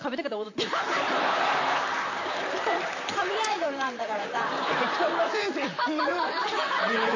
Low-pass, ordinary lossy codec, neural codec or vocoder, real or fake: 7.2 kHz; AAC, 48 kbps; vocoder, 44.1 kHz, 128 mel bands, Pupu-Vocoder; fake